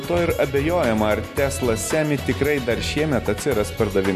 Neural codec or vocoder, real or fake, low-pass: none; real; 14.4 kHz